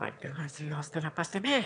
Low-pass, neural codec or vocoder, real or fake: 9.9 kHz; autoencoder, 22.05 kHz, a latent of 192 numbers a frame, VITS, trained on one speaker; fake